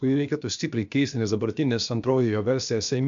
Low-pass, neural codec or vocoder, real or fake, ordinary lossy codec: 7.2 kHz; codec, 16 kHz, about 1 kbps, DyCAST, with the encoder's durations; fake; MP3, 64 kbps